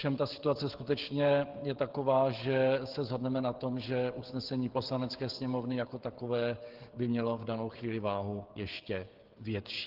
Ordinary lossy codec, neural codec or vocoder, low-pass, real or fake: Opus, 16 kbps; codec, 16 kHz, 16 kbps, FreqCodec, smaller model; 5.4 kHz; fake